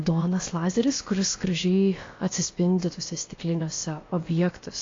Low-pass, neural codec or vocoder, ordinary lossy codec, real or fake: 7.2 kHz; codec, 16 kHz, about 1 kbps, DyCAST, with the encoder's durations; AAC, 32 kbps; fake